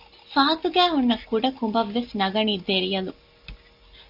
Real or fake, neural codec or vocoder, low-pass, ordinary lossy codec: real; none; 5.4 kHz; MP3, 48 kbps